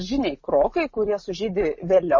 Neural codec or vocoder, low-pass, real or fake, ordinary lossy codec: none; 7.2 kHz; real; MP3, 32 kbps